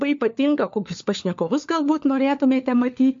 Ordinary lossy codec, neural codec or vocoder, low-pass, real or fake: MP3, 48 kbps; codec, 16 kHz, 4 kbps, FunCodec, trained on LibriTTS, 50 frames a second; 7.2 kHz; fake